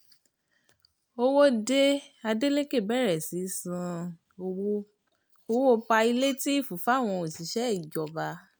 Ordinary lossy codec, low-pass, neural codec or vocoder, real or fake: none; none; none; real